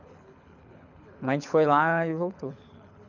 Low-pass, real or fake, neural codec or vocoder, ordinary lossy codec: 7.2 kHz; fake; codec, 24 kHz, 6 kbps, HILCodec; none